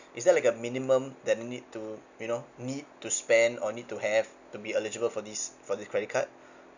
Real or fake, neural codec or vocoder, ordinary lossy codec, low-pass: real; none; none; 7.2 kHz